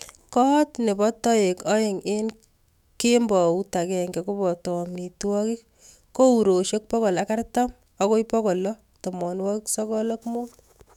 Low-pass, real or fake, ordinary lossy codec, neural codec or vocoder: 19.8 kHz; fake; none; autoencoder, 48 kHz, 128 numbers a frame, DAC-VAE, trained on Japanese speech